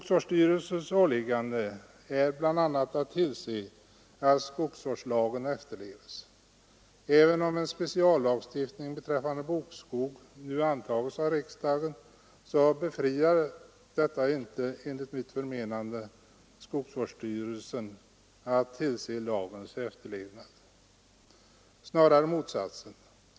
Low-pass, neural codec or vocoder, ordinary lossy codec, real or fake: none; none; none; real